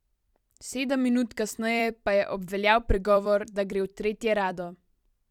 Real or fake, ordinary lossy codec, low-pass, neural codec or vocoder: fake; none; 19.8 kHz; vocoder, 44.1 kHz, 128 mel bands every 256 samples, BigVGAN v2